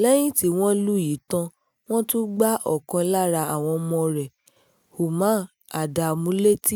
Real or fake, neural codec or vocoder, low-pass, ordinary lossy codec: real; none; none; none